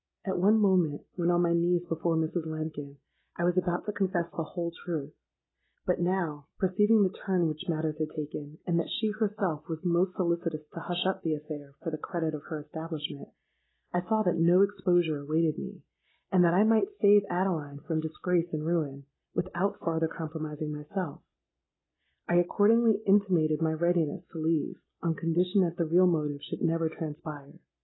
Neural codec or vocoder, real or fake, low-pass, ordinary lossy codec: none; real; 7.2 kHz; AAC, 16 kbps